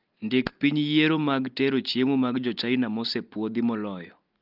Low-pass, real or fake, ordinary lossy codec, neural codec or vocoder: 5.4 kHz; real; Opus, 24 kbps; none